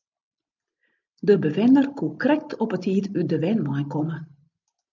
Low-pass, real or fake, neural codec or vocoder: 7.2 kHz; real; none